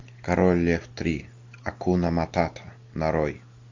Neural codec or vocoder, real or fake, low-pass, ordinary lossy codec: none; real; 7.2 kHz; MP3, 48 kbps